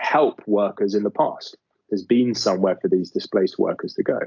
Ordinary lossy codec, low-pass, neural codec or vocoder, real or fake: AAC, 48 kbps; 7.2 kHz; none; real